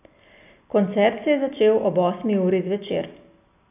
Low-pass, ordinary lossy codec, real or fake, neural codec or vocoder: 3.6 kHz; none; real; none